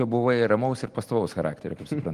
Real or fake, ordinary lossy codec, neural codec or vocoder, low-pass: real; Opus, 24 kbps; none; 14.4 kHz